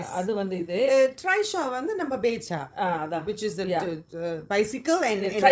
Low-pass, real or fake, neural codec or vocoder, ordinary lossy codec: none; fake; codec, 16 kHz, 16 kbps, FreqCodec, larger model; none